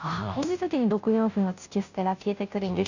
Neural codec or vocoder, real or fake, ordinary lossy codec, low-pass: codec, 16 kHz, 0.5 kbps, FunCodec, trained on Chinese and English, 25 frames a second; fake; none; 7.2 kHz